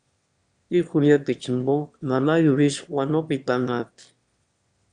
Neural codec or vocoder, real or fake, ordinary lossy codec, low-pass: autoencoder, 22.05 kHz, a latent of 192 numbers a frame, VITS, trained on one speaker; fake; Opus, 64 kbps; 9.9 kHz